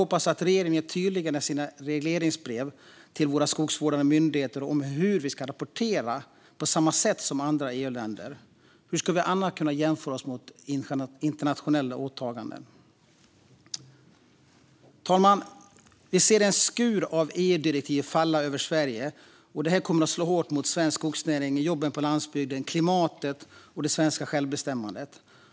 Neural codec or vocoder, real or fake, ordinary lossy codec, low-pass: none; real; none; none